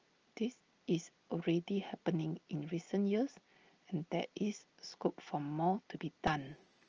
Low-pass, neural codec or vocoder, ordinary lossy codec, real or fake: 7.2 kHz; none; Opus, 24 kbps; real